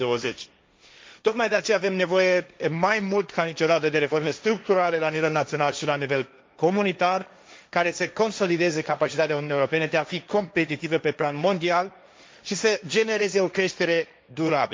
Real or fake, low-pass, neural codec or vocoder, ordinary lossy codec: fake; none; codec, 16 kHz, 1.1 kbps, Voila-Tokenizer; none